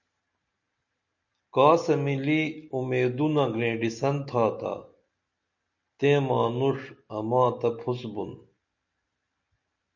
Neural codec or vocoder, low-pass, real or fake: none; 7.2 kHz; real